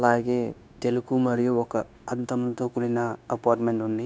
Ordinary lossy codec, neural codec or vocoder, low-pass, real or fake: none; codec, 16 kHz, 0.9 kbps, LongCat-Audio-Codec; none; fake